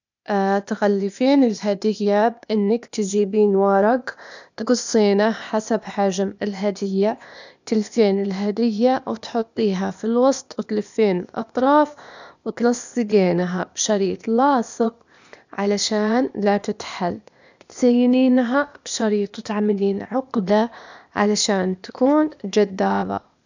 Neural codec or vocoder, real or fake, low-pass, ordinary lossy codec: codec, 16 kHz, 0.8 kbps, ZipCodec; fake; 7.2 kHz; none